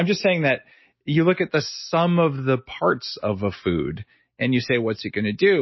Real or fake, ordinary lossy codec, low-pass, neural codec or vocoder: real; MP3, 24 kbps; 7.2 kHz; none